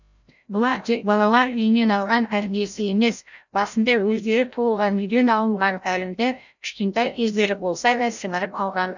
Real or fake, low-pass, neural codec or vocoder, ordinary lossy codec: fake; 7.2 kHz; codec, 16 kHz, 0.5 kbps, FreqCodec, larger model; none